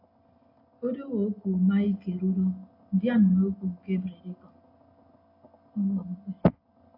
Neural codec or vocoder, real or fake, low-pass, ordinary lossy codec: none; real; 5.4 kHz; AAC, 32 kbps